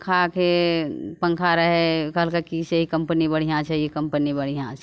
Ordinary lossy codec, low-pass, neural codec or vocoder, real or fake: none; none; none; real